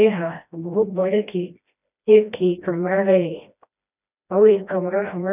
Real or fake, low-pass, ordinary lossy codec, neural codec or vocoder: fake; 3.6 kHz; none; codec, 16 kHz, 1 kbps, FreqCodec, smaller model